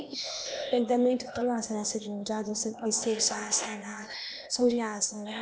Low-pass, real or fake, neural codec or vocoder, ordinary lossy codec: none; fake; codec, 16 kHz, 0.8 kbps, ZipCodec; none